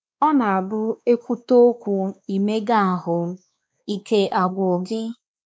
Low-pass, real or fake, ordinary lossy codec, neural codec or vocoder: none; fake; none; codec, 16 kHz, 2 kbps, X-Codec, WavLM features, trained on Multilingual LibriSpeech